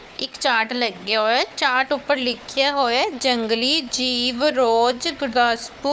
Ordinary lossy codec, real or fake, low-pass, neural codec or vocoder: none; fake; none; codec, 16 kHz, 4 kbps, FunCodec, trained on Chinese and English, 50 frames a second